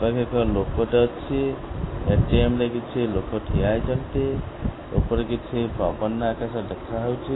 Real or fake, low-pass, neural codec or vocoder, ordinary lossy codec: real; 7.2 kHz; none; AAC, 16 kbps